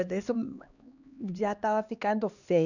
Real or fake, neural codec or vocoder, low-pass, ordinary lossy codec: fake; codec, 16 kHz, 2 kbps, X-Codec, HuBERT features, trained on LibriSpeech; 7.2 kHz; none